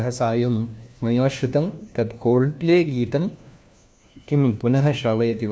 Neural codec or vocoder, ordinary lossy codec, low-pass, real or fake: codec, 16 kHz, 1 kbps, FunCodec, trained on LibriTTS, 50 frames a second; none; none; fake